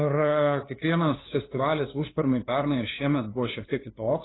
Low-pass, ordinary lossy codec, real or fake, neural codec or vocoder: 7.2 kHz; AAC, 16 kbps; fake; codec, 16 kHz, 2 kbps, FunCodec, trained on LibriTTS, 25 frames a second